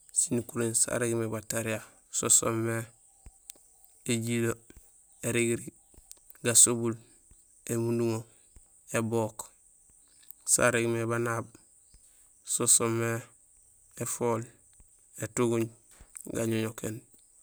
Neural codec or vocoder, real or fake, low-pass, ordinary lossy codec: none; real; none; none